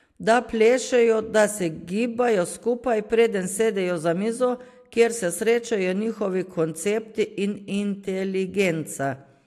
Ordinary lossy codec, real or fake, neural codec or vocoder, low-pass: AAC, 64 kbps; real; none; 14.4 kHz